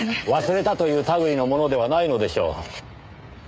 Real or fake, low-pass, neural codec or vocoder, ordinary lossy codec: fake; none; codec, 16 kHz, 16 kbps, FreqCodec, smaller model; none